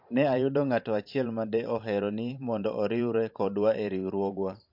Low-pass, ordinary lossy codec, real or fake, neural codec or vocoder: 5.4 kHz; MP3, 48 kbps; fake; vocoder, 44.1 kHz, 128 mel bands every 512 samples, BigVGAN v2